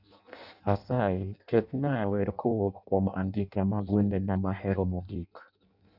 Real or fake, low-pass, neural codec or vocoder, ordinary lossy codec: fake; 5.4 kHz; codec, 16 kHz in and 24 kHz out, 0.6 kbps, FireRedTTS-2 codec; none